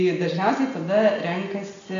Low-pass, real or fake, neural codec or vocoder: 7.2 kHz; real; none